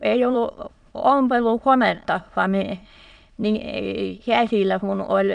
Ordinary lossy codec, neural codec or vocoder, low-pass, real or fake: none; autoencoder, 22.05 kHz, a latent of 192 numbers a frame, VITS, trained on many speakers; 9.9 kHz; fake